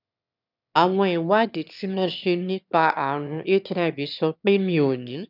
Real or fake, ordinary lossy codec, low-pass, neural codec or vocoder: fake; none; 5.4 kHz; autoencoder, 22.05 kHz, a latent of 192 numbers a frame, VITS, trained on one speaker